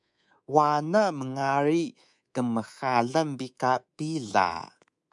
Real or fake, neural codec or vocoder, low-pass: fake; codec, 24 kHz, 3.1 kbps, DualCodec; 10.8 kHz